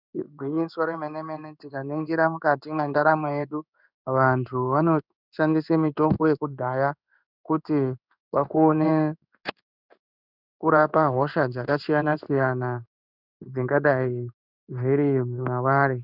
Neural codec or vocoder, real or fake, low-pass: codec, 16 kHz in and 24 kHz out, 1 kbps, XY-Tokenizer; fake; 5.4 kHz